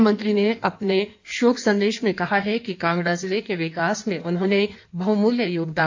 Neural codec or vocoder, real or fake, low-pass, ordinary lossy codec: codec, 16 kHz in and 24 kHz out, 1.1 kbps, FireRedTTS-2 codec; fake; 7.2 kHz; AAC, 48 kbps